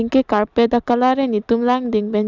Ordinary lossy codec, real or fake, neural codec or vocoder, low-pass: none; real; none; 7.2 kHz